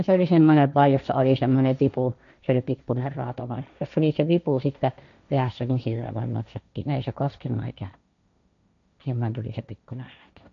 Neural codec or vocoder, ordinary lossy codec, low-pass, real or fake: codec, 16 kHz, 1.1 kbps, Voila-Tokenizer; none; 7.2 kHz; fake